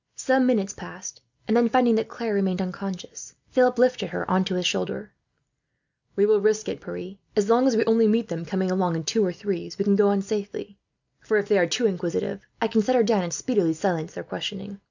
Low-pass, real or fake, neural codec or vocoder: 7.2 kHz; real; none